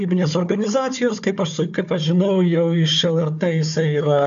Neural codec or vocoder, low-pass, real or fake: codec, 16 kHz, 4 kbps, FunCodec, trained on Chinese and English, 50 frames a second; 7.2 kHz; fake